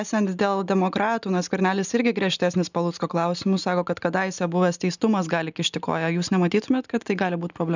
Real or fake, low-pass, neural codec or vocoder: real; 7.2 kHz; none